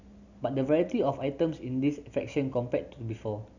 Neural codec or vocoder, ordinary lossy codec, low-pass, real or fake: none; none; 7.2 kHz; real